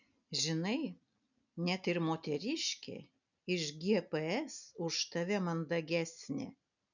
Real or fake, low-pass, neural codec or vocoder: real; 7.2 kHz; none